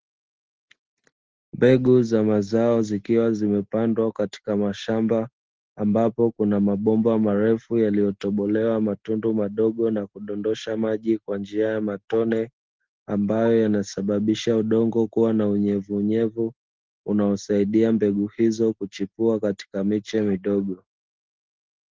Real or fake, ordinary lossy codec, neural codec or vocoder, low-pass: real; Opus, 16 kbps; none; 7.2 kHz